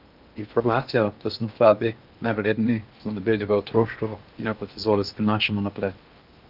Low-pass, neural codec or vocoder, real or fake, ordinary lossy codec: 5.4 kHz; codec, 16 kHz in and 24 kHz out, 0.8 kbps, FocalCodec, streaming, 65536 codes; fake; Opus, 32 kbps